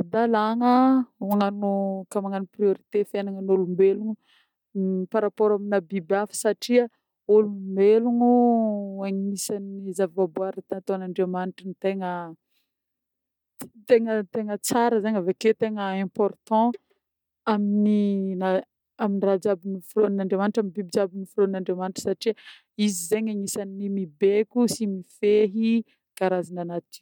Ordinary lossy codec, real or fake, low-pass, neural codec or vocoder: none; real; 19.8 kHz; none